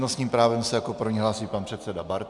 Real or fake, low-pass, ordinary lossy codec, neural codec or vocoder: real; 10.8 kHz; MP3, 96 kbps; none